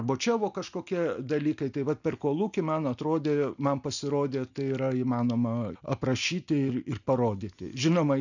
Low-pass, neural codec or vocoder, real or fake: 7.2 kHz; none; real